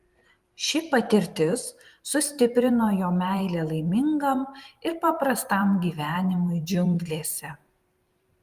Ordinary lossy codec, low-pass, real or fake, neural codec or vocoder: Opus, 32 kbps; 14.4 kHz; fake; vocoder, 44.1 kHz, 128 mel bands every 512 samples, BigVGAN v2